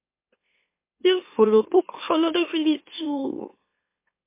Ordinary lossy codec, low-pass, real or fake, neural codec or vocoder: MP3, 24 kbps; 3.6 kHz; fake; autoencoder, 44.1 kHz, a latent of 192 numbers a frame, MeloTTS